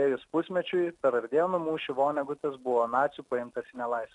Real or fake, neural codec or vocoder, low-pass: real; none; 10.8 kHz